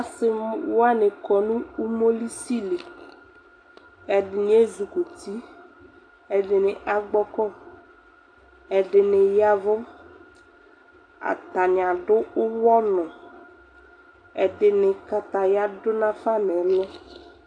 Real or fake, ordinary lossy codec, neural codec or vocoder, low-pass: real; AAC, 48 kbps; none; 9.9 kHz